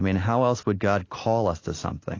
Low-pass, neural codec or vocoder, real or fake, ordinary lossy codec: 7.2 kHz; none; real; AAC, 32 kbps